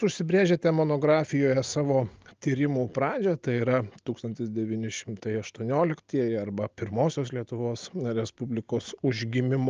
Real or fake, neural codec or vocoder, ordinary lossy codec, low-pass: real; none; Opus, 24 kbps; 7.2 kHz